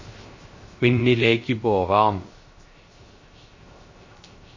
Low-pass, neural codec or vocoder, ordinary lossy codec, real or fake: 7.2 kHz; codec, 16 kHz, 0.3 kbps, FocalCodec; MP3, 32 kbps; fake